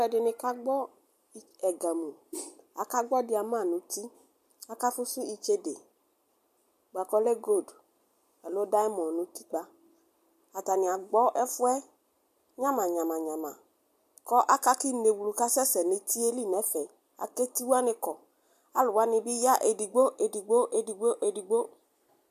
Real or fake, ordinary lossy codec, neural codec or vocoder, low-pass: real; MP3, 96 kbps; none; 14.4 kHz